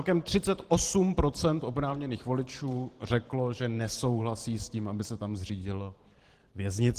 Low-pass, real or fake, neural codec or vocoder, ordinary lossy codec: 14.4 kHz; fake; vocoder, 44.1 kHz, 128 mel bands every 512 samples, BigVGAN v2; Opus, 16 kbps